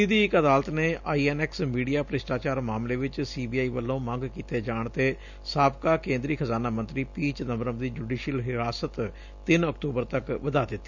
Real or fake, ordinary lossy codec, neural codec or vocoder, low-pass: real; none; none; 7.2 kHz